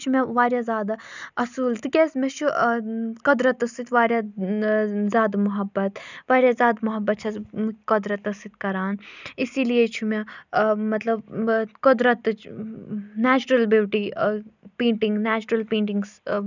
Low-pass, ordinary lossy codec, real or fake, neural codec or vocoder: 7.2 kHz; none; real; none